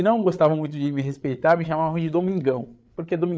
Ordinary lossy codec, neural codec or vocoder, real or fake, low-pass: none; codec, 16 kHz, 8 kbps, FreqCodec, larger model; fake; none